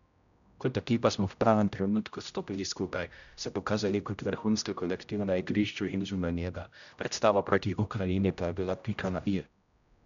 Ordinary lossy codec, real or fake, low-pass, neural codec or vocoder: none; fake; 7.2 kHz; codec, 16 kHz, 0.5 kbps, X-Codec, HuBERT features, trained on general audio